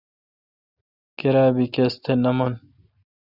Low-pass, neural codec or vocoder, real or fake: 5.4 kHz; none; real